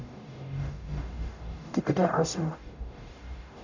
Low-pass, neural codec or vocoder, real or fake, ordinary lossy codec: 7.2 kHz; codec, 44.1 kHz, 0.9 kbps, DAC; fake; none